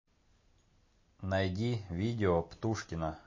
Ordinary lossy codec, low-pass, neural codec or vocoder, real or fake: MP3, 32 kbps; 7.2 kHz; none; real